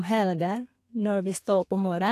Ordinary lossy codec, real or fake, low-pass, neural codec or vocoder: AAC, 64 kbps; fake; 14.4 kHz; codec, 32 kHz, 1.9 kbps, SNAC